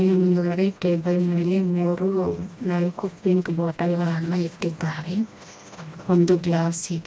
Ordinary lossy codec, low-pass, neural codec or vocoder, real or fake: none; none; codec, 16 kHz, 1 kbps, FreqCodec, smaller model; fake